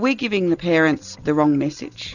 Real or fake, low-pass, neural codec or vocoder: real; 7.2 kHz; none